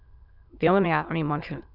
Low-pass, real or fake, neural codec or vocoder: 5.4 kHz; fake; autoencoder, 22.05 kHz, a latent of 192 numbers a frame, VITS, trained on many speakers